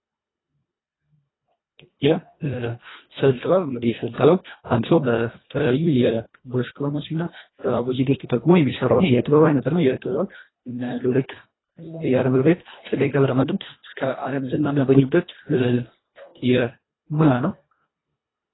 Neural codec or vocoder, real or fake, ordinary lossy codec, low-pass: codec, 24 kHz, 1.5 kbps, HILCodec; fake; AAC, 16 kbps; 7.2 kHz